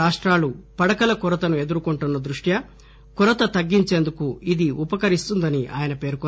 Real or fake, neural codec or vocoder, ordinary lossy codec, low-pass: real; none; none; none